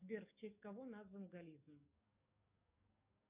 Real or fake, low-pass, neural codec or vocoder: fake; 3.6 kHz; codec, 44.1 kHz, 7.8 kbps, DAC